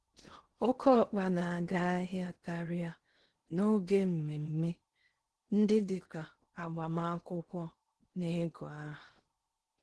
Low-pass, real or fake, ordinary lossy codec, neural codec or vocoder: 10.8 kHz; fake; Opus, 16 kbps; codec, 16 kHz in and 24 kHz out, 0.6 kbps, FocalCodec, streaming, 2048 codes